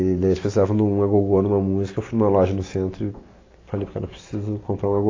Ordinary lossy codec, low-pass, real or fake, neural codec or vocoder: AAC, 32 kbps; 7.2 kHz; real; none